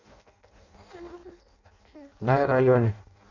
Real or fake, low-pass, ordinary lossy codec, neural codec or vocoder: fake; 7.2 kHz; none; codec, 16 kHz in and 24 kHz out, 0.6 kbps, FireRedTTS-2 codec